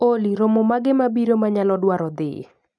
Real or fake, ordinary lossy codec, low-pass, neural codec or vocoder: real; none; none; none